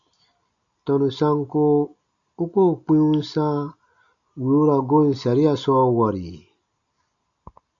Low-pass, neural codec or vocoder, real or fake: 7.2 kHz; none; real